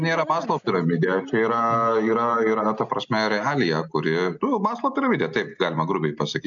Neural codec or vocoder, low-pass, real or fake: none; 7.2 kHz; real